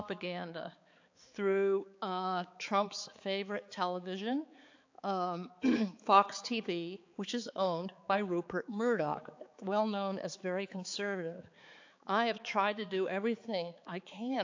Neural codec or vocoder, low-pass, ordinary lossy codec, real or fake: codec, 16 kHz, 4 kbps, X-Codec, HuBERT features, trained on balanced general audio; 7.2 kHz; AAC, 48 kbps; fake